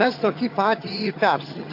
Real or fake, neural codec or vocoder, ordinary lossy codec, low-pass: fake; vocoder, 22.05 kHz, 80 mel bands, HiFi-GAN; AAC, 32 kbps; 5.4 kHz